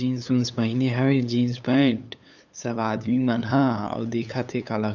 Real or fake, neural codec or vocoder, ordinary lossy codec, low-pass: fake; codec, 16 kHz, 8 kbps, FunCodec, trained on LibriTTS, 25 frames a second; none; 7.2 kHz